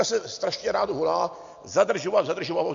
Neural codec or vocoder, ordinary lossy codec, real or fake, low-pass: none; MP3, 48 kbps; real; 7.2 kHz